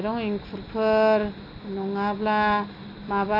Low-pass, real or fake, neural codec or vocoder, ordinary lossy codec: 5.4 kHz; real; none; MP3, 32 kbps